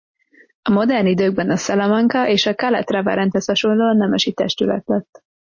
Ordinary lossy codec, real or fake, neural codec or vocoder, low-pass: MP3, 32 kbps; real; none; 7.2 kHz